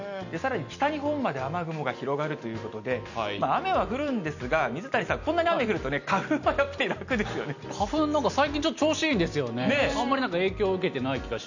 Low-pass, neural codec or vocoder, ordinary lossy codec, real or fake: 7.2 kHz; none; none; real